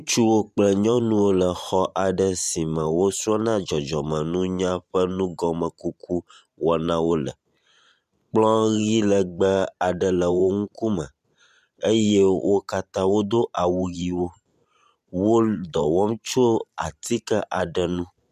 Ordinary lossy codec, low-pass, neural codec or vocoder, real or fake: MP3, 96 kbps; 14.4 kHz; vocoder, 44.1 kHz, 128 mel bands every 512 samples, BigVGAN v2; fake